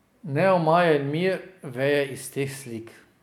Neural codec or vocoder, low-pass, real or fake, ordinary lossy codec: none; 19.8 kHz; real; none